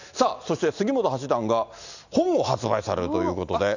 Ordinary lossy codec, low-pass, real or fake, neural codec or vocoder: none; 7.2 kHz; real; none